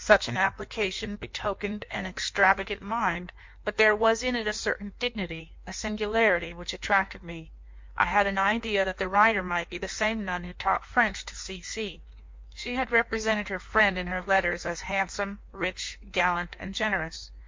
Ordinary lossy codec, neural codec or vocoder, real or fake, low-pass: MP3, 48 kbps; codec, 16 kHz in and 24 kHz out, 1.1 kbps, FireRedTTS-2 codec; fake; 7.2 kHz